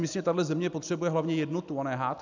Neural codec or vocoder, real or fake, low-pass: none; real; 7.2 kHz